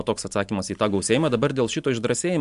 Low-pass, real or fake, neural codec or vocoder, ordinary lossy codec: 10.8 kHz; real; none; MP3, 64 kbps